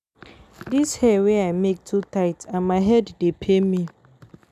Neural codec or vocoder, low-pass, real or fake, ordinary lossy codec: none; 14.4 kHz; real; none